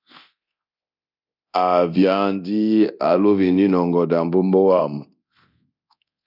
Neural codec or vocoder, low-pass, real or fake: codec, 24 kHz, 0.9 kbps, DualCodec; 5.4 kHz; fake